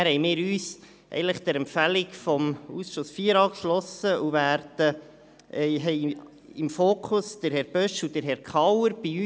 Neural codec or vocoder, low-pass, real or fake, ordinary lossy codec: none; none; real; none